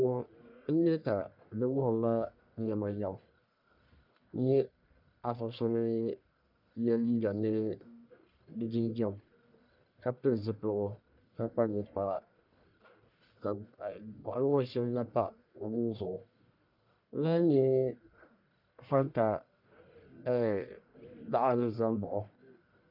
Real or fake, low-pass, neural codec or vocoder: fake; 5.4 kHz; codec, 44.1 kHz, 1.7 kbps, Pupu-Codec